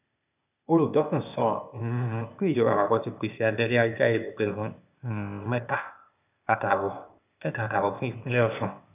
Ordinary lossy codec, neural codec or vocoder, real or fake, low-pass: none; codec, 16 kHz, 0.8 kbps, ZipCodec; fake; 3.6 kHz